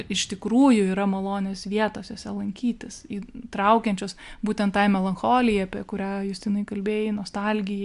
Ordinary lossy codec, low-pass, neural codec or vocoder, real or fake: AAC, 96 kbps; 10.8 kHz; none; real